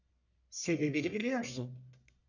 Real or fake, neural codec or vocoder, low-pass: fake; codec, 44.1 kHz, 1.7 kbps, Pupu-Codec; 7.2 kHz